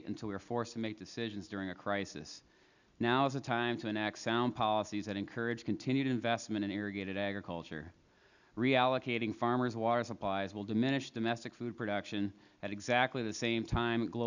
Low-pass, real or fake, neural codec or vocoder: 7.2 kHz; real; none